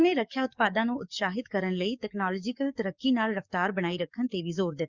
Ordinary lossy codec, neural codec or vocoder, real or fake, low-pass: Opus, 64 kbps; codec, 44.1 kHz, 7.8 kbps, DAC; fake; 7.2 kHz